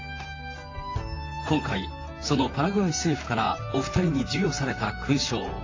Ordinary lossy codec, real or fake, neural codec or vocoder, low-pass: AAC, 32 kbps; fake; vocoder, 44.1 kHz, 128 mel bands, Pupu-Vocoder; 7.2 kHz